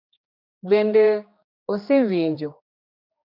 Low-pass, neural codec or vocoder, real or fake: 5.4 kHz; codec, 16 kHz, 2 kbps, X-Codec, HuBERT features, trained on general audio; fake